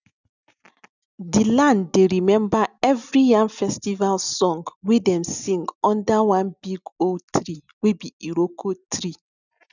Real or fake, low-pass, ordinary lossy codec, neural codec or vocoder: real; 7.2 kHz; none; none